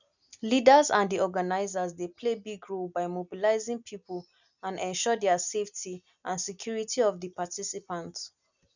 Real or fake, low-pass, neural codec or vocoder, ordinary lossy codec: real; 7.2 kHz; none; none